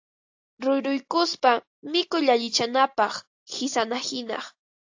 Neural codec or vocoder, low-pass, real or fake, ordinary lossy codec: none; 7.2 kHz; real; AAC, 48 kbps